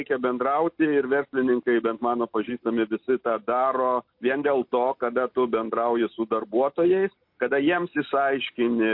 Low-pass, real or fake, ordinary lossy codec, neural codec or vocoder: 5.4 kHz; real; MP3, 32 kbps; none